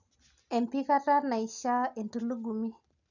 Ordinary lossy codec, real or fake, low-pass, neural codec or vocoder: none; real; 7.2 kHz; none